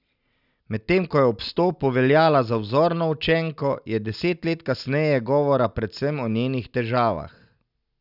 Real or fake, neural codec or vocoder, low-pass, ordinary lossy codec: real; none; 5.4 kHz; none